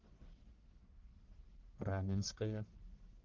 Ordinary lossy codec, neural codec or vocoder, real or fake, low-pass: Opus, 16 kbps; codec, 44.1 kHz, 1.7 kbps, Pupu-Codec; fake; 7.2 kHz